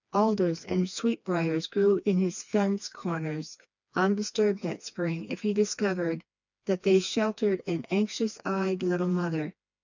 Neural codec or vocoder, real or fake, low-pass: codec, 16 kHz, 2 kbps, FreqCodec, smaller model; fake; 7.2 kHz